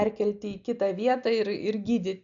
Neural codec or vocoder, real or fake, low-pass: none; real; 7.2 kHz